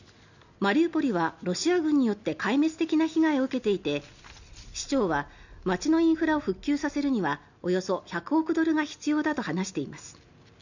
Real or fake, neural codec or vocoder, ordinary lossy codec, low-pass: real; none; none; 7.2 kHz